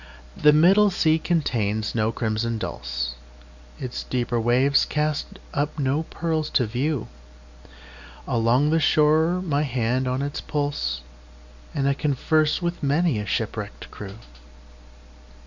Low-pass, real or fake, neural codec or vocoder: 7.2 kHz; real; none